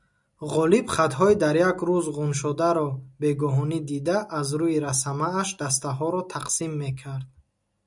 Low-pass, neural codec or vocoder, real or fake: 10.8 kHz; none; real